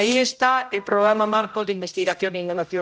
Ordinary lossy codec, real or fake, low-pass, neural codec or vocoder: none; fake; none; codec, 16 kHz, 0.5 kbps, X-Codec, HuBERT features, trained on general audio